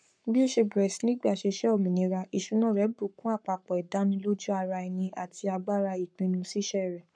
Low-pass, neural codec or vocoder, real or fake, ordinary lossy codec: 9.9 kHz; codec, 44.1 kHz, 7.8 kbps, Pupu-Codec; fake; none